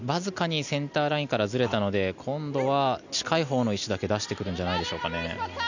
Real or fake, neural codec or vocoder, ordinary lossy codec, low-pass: real; none; none; 7.2 kHz